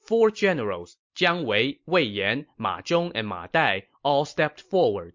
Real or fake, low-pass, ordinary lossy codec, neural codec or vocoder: fake; 7.2 kHz; MP3, 48 kbps; vocoder, 44.1 kHz, 128 mel bands every 256 samples, BigVGAN v2